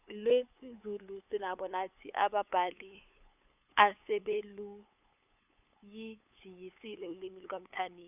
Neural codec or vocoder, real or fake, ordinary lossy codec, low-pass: codec, 16 kHz, 8 kbps, FunCodec, trained on LibriTTS, 25 frames a second; fake; none; 3.6 kHz